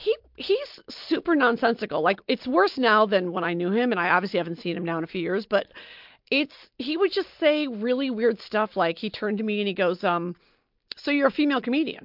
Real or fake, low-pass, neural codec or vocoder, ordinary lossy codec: real; 5.4 kHz; none; MP3, 48 kbps